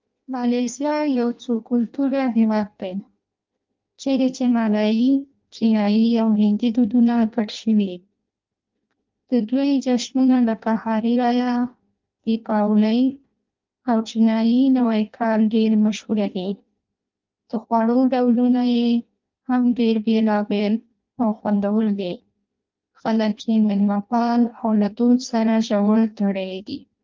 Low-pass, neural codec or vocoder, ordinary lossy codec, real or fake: 7.2 kHz; codec, 16 kHz in and 24 kHz out, 0.6 kbps, FireRedTTS-2 codec; Opus, 24 kbps; fake